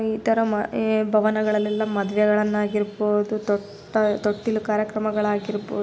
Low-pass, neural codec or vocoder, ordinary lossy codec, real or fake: none; none; none; real